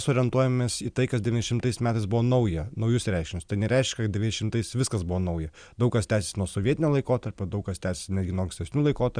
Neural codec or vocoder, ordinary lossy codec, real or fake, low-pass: none; Opus, 64 kbps; real; 9.9 kHz